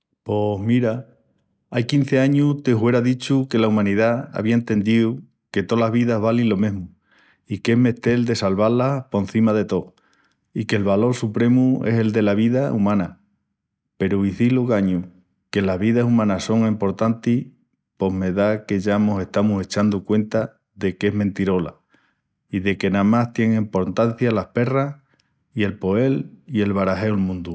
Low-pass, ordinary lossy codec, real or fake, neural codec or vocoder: none; none; real; none